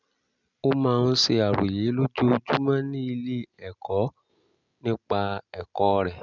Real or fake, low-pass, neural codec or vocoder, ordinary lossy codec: real; 7.2 kHz; none; none